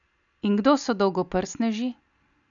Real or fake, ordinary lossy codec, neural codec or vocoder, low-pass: real; none; none; 7.2 kHz